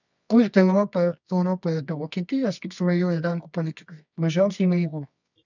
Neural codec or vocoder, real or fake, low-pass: codec, 24 kHz, 0.9 kbps, WavTokenizer, medium music audio release; fake; 7.2 kHz